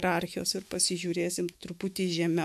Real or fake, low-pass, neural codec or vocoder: fake; 14.4 kHz; autoencoder, 48 kHz, 128 numbers a frame, DAC-VAE, trained on Japanese speech